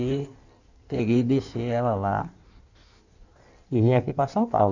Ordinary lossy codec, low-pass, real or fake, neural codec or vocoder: none; 7.2 kHz; fake; codec, 16 kHz in and 24 kHz out, 1.1 kbps, FireRedTTS-2 codec